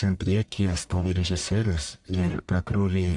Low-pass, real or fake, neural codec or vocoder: 10.8 kHz; fake; codec, 44.1 kHz, 1.7 kbps, Pupu-Codec